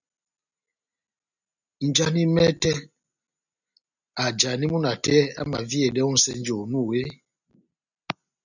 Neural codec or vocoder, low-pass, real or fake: none; 7.2 kHz; real